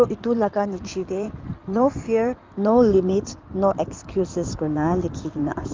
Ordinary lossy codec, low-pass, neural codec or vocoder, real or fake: Opus, 24 kbps; 7.2 kHz; codec, 16 kHz in and 24 kHz out, 2.2 kbps, FireRedTTS-2 codec; fake